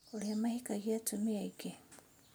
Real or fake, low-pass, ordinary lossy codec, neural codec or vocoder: real; none; none; none